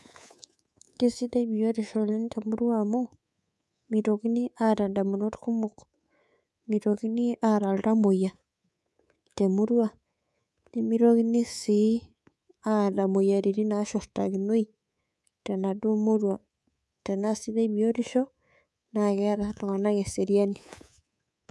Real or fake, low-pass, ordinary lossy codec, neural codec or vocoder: fake; none; none; codec, 24 kHz, 3.1 kbps, DualCodec